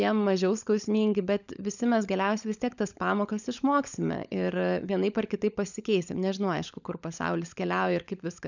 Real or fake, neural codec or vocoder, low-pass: fake; codec, 16 kHz, 16 kbps, FunCodec, trained on LibriTTS, 50 frames a second; 7.2 kHz